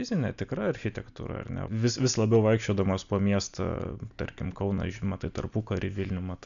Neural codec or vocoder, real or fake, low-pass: none; real; 7.2 kHz